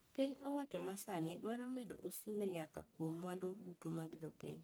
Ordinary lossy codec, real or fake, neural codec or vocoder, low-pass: none; fake; codec, 44.1 kHz, 1.7 kbps, Pupu-Codec; none